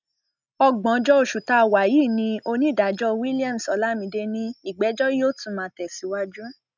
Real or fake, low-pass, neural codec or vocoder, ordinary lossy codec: real; 7.2 kHz; none; none